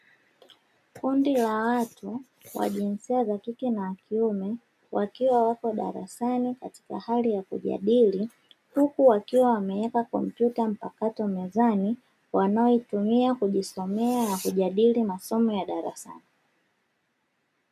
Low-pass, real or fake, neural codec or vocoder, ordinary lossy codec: 14.4 kHz; real; none; MP3, 96 kbps